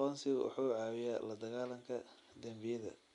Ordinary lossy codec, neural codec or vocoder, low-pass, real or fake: none; none; 10.8 kHz; real